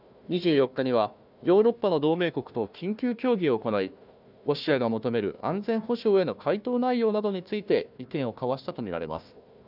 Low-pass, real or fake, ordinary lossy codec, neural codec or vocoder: 5.4 kHz; fake; none; codec, 16 kHz, 1 kbps, FunCodec, trained on Chinese and English, 50 frames a second